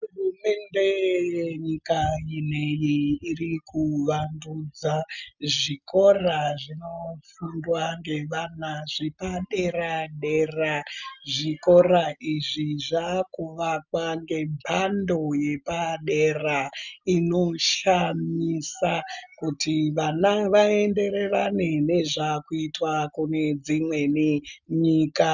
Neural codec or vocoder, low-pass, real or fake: none; 7.2 kHz; real